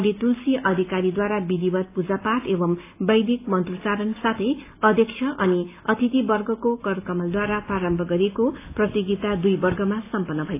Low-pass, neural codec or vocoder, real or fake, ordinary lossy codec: 3.6 kHz; none; real; AAC, 24 kbps